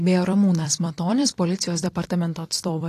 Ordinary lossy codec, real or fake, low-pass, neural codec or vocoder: AAC, 48 kbps; fake; 14.4 kHz; vocoder, 44.1 kHz, 128 mel bands every 256 samples, BigVGAN v2